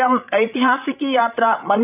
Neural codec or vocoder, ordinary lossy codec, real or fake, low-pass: codec, 16 kHz, 8 kbps, FreqCodec, larger model; none; fake; 3.6 kHz